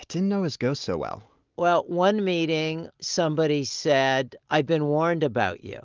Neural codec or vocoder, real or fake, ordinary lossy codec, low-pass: none; real; Opus, 24 kbps; 7.2 kHz